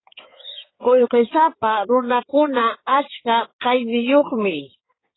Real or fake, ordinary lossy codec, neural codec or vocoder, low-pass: fake; AAC, 16 kbps; codec, 16 kHz in and 24 kHz out, 2.2 kbps, FireRedTTS-2 codec; 7.2 kHz